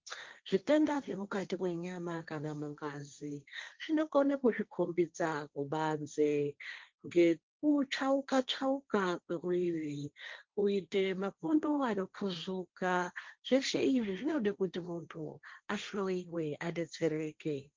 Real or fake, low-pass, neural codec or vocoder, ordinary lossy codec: fake; 7.2 kHz; codec, 16 kHz, 1.1 kbps, Voila-Tokenizer; Opus, 24 kbps